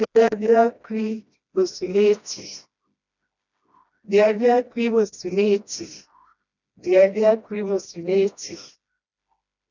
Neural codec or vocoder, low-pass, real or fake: codec, 16 kHz, 1 kbps, FreqCodec, smaller model; 7.2 kHz; fake